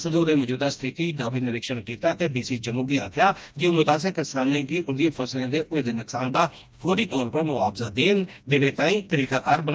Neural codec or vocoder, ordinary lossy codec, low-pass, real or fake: codec, 16 kHz, 1 kbps, FreqCodec, smaller model; none; none; fake